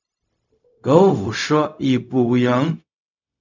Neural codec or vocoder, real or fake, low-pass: codec, 16 kHz, 0.4 kbps, LongCat-Audio-Codec; fake; 7.2 kHz